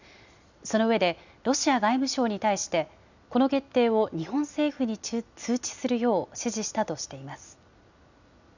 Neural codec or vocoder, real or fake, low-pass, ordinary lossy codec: none; real; 7.2 kHz; none